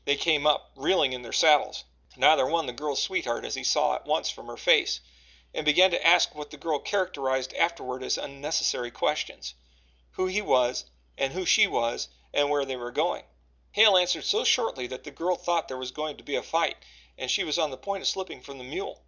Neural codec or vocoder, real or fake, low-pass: none; real; 7.2 kHz